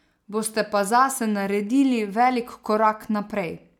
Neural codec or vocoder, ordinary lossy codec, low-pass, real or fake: none; none; 19.8 kHz; real